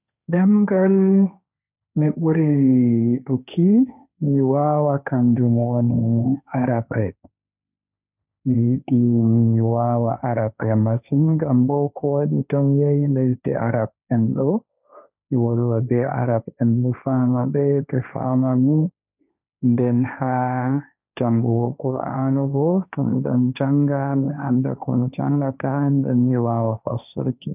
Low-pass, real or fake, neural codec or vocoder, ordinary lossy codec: 3.6 kHz; fake; codec, 16 kHz, 1.1 kbps, Voila-Tokenizer; none